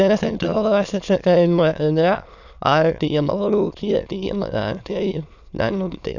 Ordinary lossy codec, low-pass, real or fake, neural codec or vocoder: none; 7.2 kHz; fake; autoencoder, 22.05 kHz, a latent of 192 numbers a frame, VITS, trained on many speakers